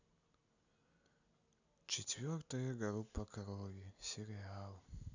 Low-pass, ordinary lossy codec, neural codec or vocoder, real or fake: 7.2 kHz; none; none; real